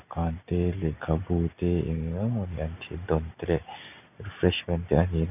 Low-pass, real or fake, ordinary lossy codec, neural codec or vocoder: 3.6 kHz; real; none; none